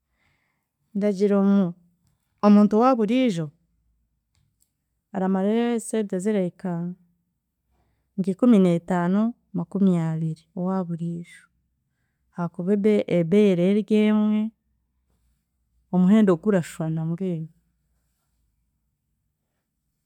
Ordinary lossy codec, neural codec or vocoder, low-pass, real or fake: none; none; 19.8 kHz; real